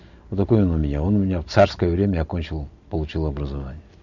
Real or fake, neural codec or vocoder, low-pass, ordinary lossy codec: real; none; 7.2 kHz; none